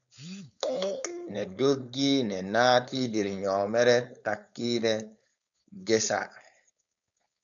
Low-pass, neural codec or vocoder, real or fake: 7.2 kHz; codec, 16 kHz, 4.8 kbps, FACodec; fake